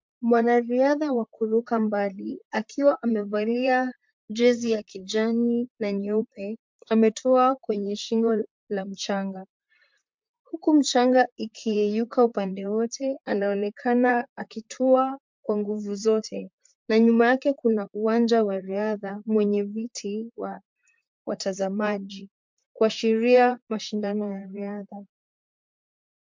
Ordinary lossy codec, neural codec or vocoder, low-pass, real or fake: MP3, 64 kbps; vocoder, 44.1 kHz, 128 mel bands, Pupu-Vocoder; 7.2 kHz; fake